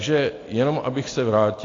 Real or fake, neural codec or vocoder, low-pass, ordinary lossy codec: real; none; 7.2 kHz; AAC, 32 kbps